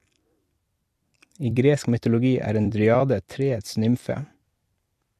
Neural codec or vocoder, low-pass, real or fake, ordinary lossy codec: vocoder, 44.1 kHz, 128 mel bands every 256 samples, BigVGAN v2; 14.4 kHz; fake; MP3, 64 kbps